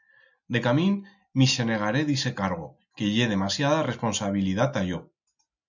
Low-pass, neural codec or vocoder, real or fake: 7.2 kHz; none; real